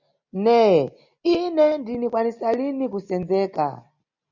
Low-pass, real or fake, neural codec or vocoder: 7.2 kHz; real; none